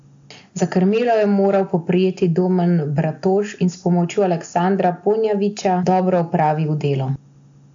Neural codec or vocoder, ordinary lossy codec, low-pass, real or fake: none; AAC, 64 kbps; 7.2 kHz; real